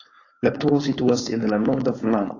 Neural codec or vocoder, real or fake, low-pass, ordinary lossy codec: codec, 16 kHz, 4.8 kbps, FACodec; fake; 7.2 kHz; AAC, 32 kbps